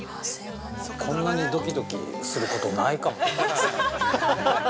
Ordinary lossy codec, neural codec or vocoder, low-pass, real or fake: none; none; none; real